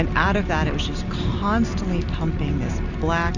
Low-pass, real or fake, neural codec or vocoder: 7.2 kHz; real; none